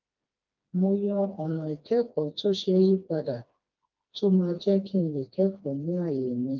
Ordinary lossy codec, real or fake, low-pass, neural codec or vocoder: Opus, 24 kbps; fake; 7.2 kHz; codec, 16 kHz, 2 kbps, FreqCodec, smaller model